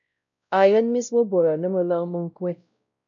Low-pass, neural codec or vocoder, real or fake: 7.2 kHz; codec, 16 kHz, 0.5 kbps, X-Codec, WavLM features, trained on Multilingual LibriSpeech; fake